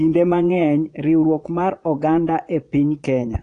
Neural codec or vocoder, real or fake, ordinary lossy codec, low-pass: vocoder, 22.05 kHz, 80 mel bands, Vocos; fake; AAC, 48 kbps; 9.9 kHz